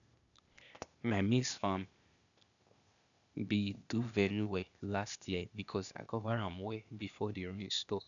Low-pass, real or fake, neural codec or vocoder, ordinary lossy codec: 7.2 kHz; fake; codec, 16 kHz, 0.8 kbps, ZipCodec; none